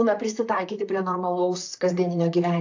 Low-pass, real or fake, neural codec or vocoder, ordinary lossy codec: 7.2 kHz; fake; vocoder, 44.1 kHz, 128 mel bands, Pupu-Vocoder; AAC, 48 kbps